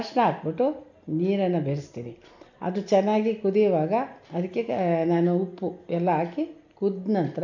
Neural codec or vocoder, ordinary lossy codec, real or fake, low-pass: none; none; real; 7.2 kHz